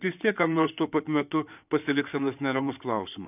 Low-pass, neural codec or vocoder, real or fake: 3.6 kHz; codec, 16 kHz in and 24 kHz out, 2.2 kbps, FireRedTTS-2 codec; fake